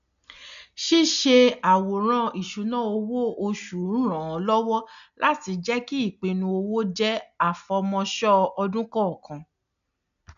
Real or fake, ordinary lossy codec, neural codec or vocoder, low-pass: real; none; none; 7.2 kHz